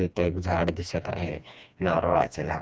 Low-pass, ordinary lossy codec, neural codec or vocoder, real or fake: none; none; codec, 16 kHz, 2 kbps, FreqCodec, smaller model; fake